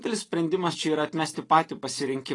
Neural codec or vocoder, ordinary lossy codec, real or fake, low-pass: none; AAC, 32 kbps; real; 10.8 kHz